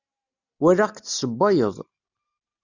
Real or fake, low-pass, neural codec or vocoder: real; 7.2 kHz; none